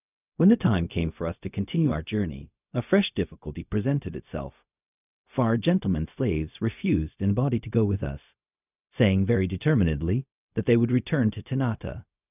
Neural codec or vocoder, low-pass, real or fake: codec, 16 kHz, 0.4 kbps, LongCat-Audio-Codec; 3.6 kHz; fake